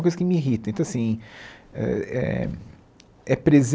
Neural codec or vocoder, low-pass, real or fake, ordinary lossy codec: none; none; real; none